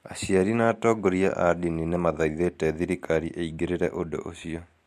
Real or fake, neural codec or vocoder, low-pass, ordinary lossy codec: real; none; 14.4 kHz; MP3, 64 kbps